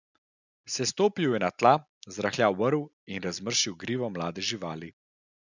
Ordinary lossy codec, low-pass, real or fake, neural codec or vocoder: none; 7.2 kHz; real; none